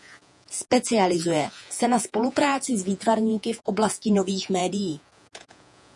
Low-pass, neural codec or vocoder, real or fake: 10.8 kHz; vocoder, 48 kHz, 128 mel bands, Vocos; fake